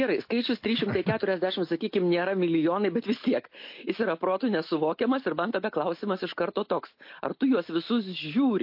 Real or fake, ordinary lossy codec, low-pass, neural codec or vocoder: real; MP3, 32 kbps; 5.4 kHz; none